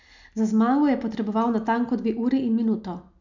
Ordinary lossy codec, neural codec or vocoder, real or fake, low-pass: none; none; real; 7.2 kHz